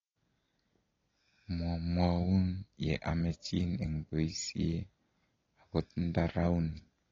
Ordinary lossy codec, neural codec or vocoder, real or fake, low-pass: AAC, 32 kbps; none; real; 7.2 kHz